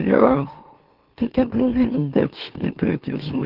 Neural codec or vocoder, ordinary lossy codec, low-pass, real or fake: autoencoder, 44.1 kHz, a latent of 192 numbers a frame, MeloTTS; Opus, 16 kbps; 5.4 kHz; fake